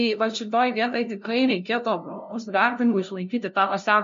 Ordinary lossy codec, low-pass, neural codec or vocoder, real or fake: MP3, 48 kbps; 7.2 kHz; codec, 16 kHz, 0.5 kbps, FunCodec, trained on LibriTTS, 25 frames a second; fake